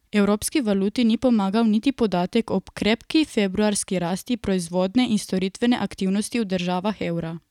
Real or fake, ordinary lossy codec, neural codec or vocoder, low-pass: real; none; none; 19.8 kHz